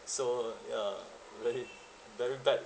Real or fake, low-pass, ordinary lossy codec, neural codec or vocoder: real; none; none; none